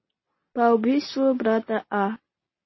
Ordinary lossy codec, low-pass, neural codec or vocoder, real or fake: MP3, 24 kbps; 7.2 kHz; none; real